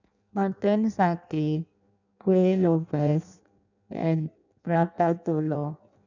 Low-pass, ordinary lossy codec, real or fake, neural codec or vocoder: 7.2 kHz; none; fake; codec, 16 kHz in and 24 kHz out, 0.6 kbps, FireRedTTS-2 codec